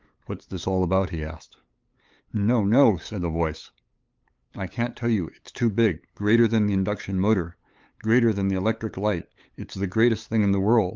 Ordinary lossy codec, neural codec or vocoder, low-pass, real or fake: Opus, 24 kbps; codec, 16 kHz, 8 kbps, FunCodec, trained on LibriTTS, 25 frames a second; 7.2 kHz; fake